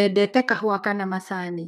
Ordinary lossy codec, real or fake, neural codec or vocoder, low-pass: none; fake; codec, 32 kHz, 1.9 kbps, SNAC; 14.4 kHz